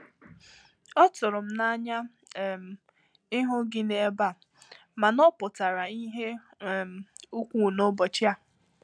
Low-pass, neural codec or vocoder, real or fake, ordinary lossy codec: 9.9 kHz; none; real; none